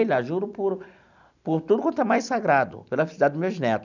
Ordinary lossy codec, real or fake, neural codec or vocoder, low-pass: none; real; none; 7.2 kHz